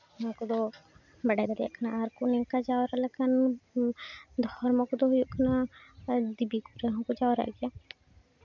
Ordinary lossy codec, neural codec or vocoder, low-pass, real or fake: none; none; 7.2 kHz; real